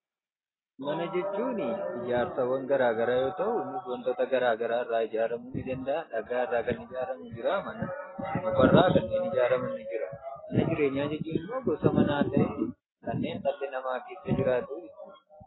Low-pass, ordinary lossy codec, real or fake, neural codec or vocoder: 7.2 kHz; AAC, 16 kbps; real; none